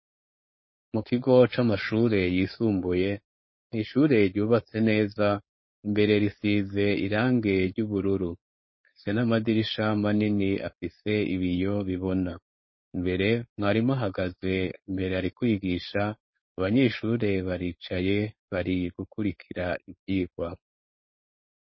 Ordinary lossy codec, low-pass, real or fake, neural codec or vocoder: MP3, 24 kbps; 7.2 kHz; fake; codec, 16 kHz, 4.8 kbps, FACodec